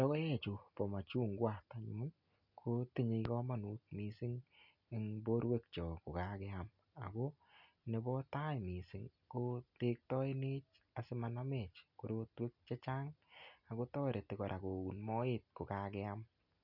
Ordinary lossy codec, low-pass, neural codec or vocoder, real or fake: none; 5.4 kHz; none; real